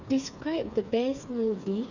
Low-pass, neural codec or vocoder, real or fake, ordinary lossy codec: 7.2 kHz; codec, 16 kHz, 4 kbps, FreqCodec, smaller model; fake; none